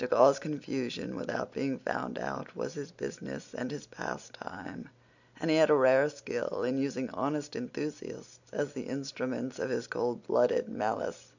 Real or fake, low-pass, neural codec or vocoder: real; 7.2 kHz; none